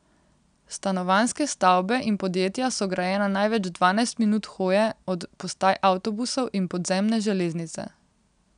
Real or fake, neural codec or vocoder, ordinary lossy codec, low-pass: real; none; none; 9.9 kHz